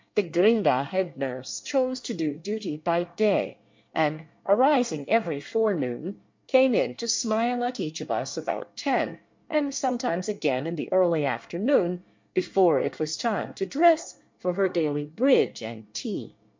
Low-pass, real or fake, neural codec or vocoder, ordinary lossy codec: 7.2 kHz; fake; codec, 24 kHz, 1 kbps, SNAC; MP3, 48 kbps